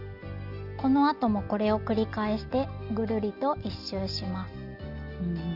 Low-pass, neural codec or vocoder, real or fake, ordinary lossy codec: 5.4 kHz; none; real; none